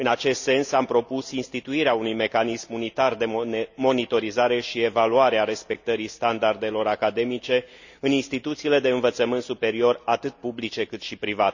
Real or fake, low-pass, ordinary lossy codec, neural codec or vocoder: real; 7.2 kHz; none; none